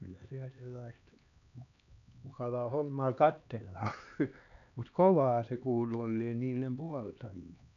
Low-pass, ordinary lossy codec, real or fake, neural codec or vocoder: 7.2 kHz; none; fake; codec, 16 kHz, 2 kbps, X-Codec, HuBERT features, trained on LibriSpeech